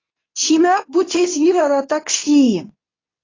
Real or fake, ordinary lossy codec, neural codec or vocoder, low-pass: fake; AAC, 32 kbps; codec, 24 kHz, 0.9 kbps, WavTokenizer, medium speech release version 2; 7.2 kHz